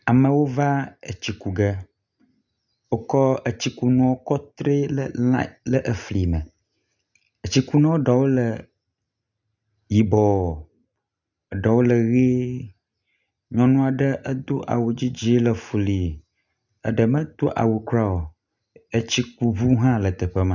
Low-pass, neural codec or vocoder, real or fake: 7.2 kHz; none; real